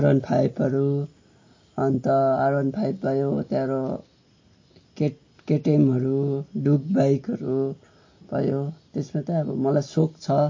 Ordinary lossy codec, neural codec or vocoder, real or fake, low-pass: MP3, 32 kbps; none; real; 7.2 kHz